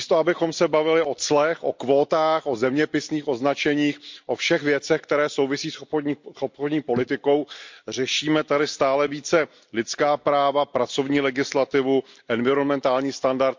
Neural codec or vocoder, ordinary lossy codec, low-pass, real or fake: none; MP3, 64 kbps; 7.2 kHz; real